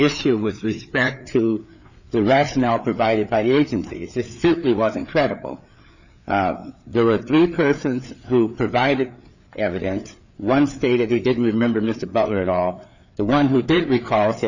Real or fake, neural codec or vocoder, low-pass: fake; codec, 16 kHz, 4 kbps, FreqCodec, larger model; 7.2 kHz